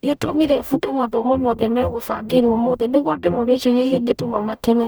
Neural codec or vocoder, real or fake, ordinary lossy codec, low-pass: codec, 44.1 kHz, 0.9 kbps, DAC; fake; none; none